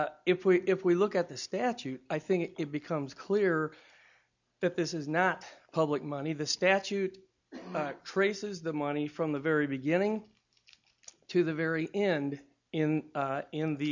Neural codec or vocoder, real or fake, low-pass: none; real; 7.2 kHz